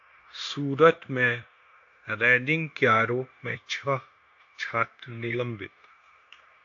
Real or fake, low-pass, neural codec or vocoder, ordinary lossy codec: fake; 7.2 kHz; codec, 16 kHz, 0.9 kbps, LongCat-Audio-Codec; AAC, 48 kbps